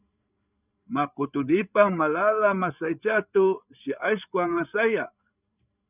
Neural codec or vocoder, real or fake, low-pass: codec, 16 kHz, 8 kbps, FreqCodec, larger model; fake; 3.6 kHz